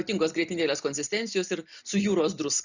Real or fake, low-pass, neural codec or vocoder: real; 7.2 kHz; none